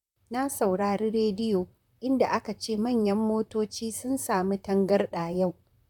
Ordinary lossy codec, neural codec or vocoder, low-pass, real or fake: none; vocoder, 44.1 kHz, 128 mel bands, Pupu-Vocoder; 19.8 kHz; fake